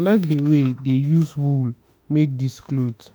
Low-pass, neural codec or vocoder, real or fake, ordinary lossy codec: none; autoencoder, 48 kHz, 32 numbers a frame, DAC-VAE, trained on Japanese speech; fake; none